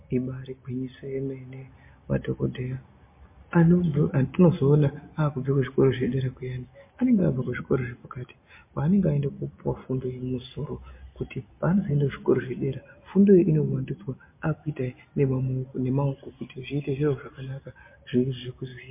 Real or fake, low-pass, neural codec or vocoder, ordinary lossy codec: real; 3.6 kHz; none; MP3, 24 kbps